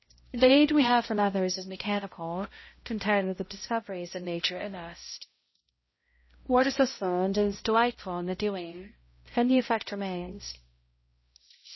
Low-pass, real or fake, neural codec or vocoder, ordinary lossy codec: 7.2 kHz; fake; codec, 16 kHz, 0.5 kbps, X-Codec, HuBERT features, trained on balanced general audio; MP3, 24 kbps